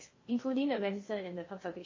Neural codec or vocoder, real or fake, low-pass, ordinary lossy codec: codec, 16 kHz in and 24 kHz out, 0.6 kbps, FocalCodec, streaming, 2048 codes; fake; 7.2 kHz; MP3, 32 kbps